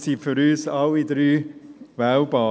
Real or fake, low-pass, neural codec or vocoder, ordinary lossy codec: real; none; none; none